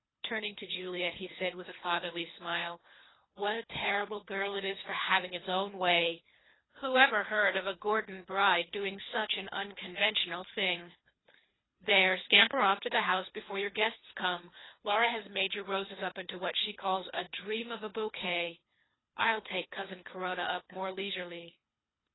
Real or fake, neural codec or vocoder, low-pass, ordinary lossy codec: fake; codec, 24 kHz, 3 kbps, HILCodec; 7.2 kHz; AAC, 16 kbps